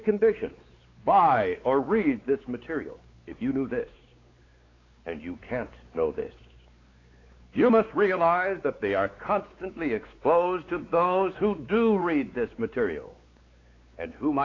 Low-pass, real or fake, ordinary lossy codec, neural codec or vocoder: 7.2 kHz; fake; AAC, 32 kbps; codec, 16 kHz, 4 kbps, X-Codec, WavLM features, trained on Multilingual LibriSpeech